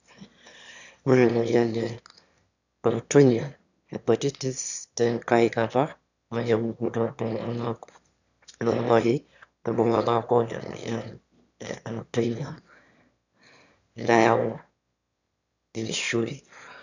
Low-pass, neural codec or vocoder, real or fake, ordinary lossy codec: 7.2 kHz; autoencoder, 22.05 kHz, a latent of 192 numbers a frame, VITS, trained on one speaker; fake; none